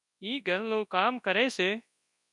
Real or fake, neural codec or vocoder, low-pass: fake; codec, 24 kHz, 0.9 kbps, WavTokenizer, large speech release; 10.8 kHz